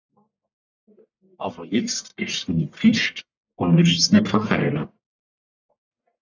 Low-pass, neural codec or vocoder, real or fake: 7.2 kHz; codec, 44.1 kHz, 1.7 kbps, Pupu-Codec; fake